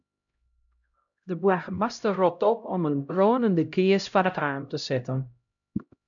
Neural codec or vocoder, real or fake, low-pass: codec, 16 kHz, 0.5 kbps, X-Codec, HuBERT features, trained on LibriSpeech; fake; 7.2 kHz